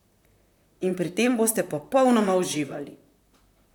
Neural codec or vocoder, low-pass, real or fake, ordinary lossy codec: vocoder, 44.1 kHz, 128 mel bands, Pupu-Vocoder; 19.8 kHz; fake; none